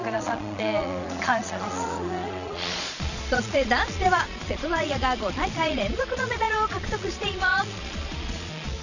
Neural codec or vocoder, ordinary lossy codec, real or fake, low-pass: vocoder, 22.05 kHz, 80 mel bands, Vocos; none; fake; 7.2 kHz